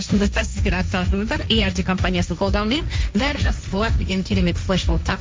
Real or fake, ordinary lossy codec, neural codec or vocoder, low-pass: fake; none; codec, 16 kHz, 1.1 kbps, Voila-Tokenizer; none